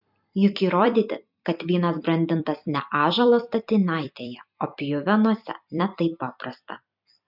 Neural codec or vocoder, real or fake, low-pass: vocoder, 44.1 kHz, 128 mel bands every 256 samples, BigVGAN v2; fake; 5.4 kHz